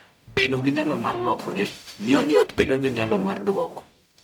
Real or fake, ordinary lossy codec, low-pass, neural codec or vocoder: fake; none; 19.8 kHz; codec, 44.1 kHz, 0.9 kbps, DAC